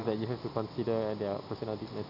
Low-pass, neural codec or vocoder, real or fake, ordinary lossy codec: 5.4 kHz; none; real; none